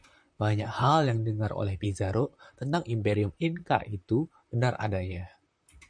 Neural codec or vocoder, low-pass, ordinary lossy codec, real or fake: vocoder, 44.1 kHz, 128 mel bands, Pupu-Vocoder; 9.9 kHz; MP3, 96 kbps; fake